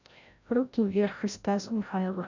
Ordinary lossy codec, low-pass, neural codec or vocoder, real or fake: none; 7.2 kHz; codec, 16 kHz, 0.5 kbps, FreqCodec, larger model; fake